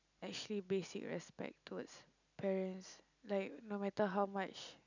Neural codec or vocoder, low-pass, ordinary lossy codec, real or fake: none; 7.2 kHz; none; real